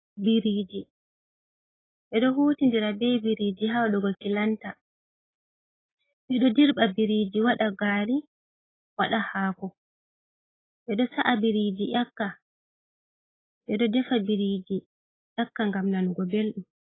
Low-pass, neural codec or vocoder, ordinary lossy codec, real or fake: 7.2 kHz; none; AAC, 16 kbps; real